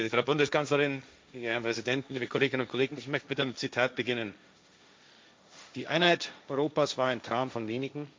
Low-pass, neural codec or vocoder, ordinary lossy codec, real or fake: none; codec, 16 kHz, 1.1 kbps, Voila-Tokenizer; none; fake